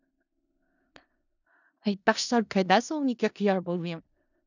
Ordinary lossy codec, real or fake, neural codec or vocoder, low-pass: none; fake; codec, 16 kHz in and 24 kHz out, 0.4 kbps, LongCat-Audio-Codec, four codebook decoder; 7.2 kHz